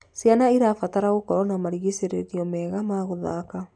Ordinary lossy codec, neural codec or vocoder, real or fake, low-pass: none; none; real; 9.9 kHz